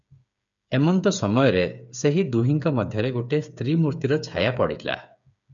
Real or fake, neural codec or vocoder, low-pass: fake; codec, 16 kHz, 8 kbps, FreqCodec, smaller model; 7.2 kHz